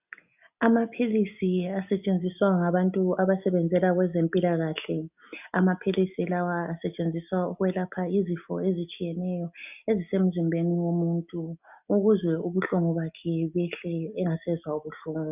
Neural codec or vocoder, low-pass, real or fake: none; 3.6 kHz; real